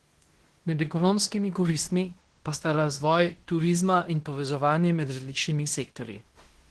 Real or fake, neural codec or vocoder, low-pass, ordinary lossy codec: fake; codec, 16 kHz in and 24 kHz out, 0.9 kbps, LongCat-Audio-Codec, fine tuned four codebook decoder; 10.8 kHz; Opus, 16 kbps